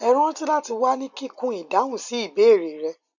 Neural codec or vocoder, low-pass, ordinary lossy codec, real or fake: none; 7.2 kHz; none; real